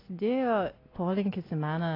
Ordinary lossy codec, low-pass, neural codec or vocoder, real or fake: AAC, 24 kbps; 5.4 kHz; none; real